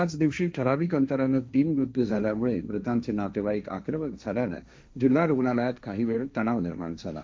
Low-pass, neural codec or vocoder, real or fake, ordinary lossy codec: none; codec, 16 kHz, 1.1 kbps, Voila-Tokenizer; fake; none